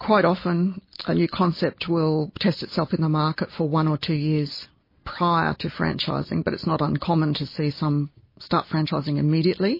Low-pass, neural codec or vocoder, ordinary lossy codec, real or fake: 5.4 kHz; none; MP3, 24 kbps; real